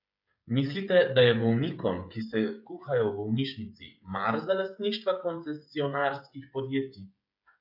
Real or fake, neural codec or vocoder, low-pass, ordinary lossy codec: fake; codec, 16 kHz, 8 kbps, FreqCodec, smaller model; 5.4 kHz; none